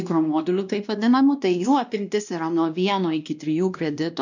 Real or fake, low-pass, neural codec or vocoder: fake; 7.2 kHz; codec, 16 kHz, 1 kbps, X-Codec, WavLM features, trained on Multilingual LibriSpeech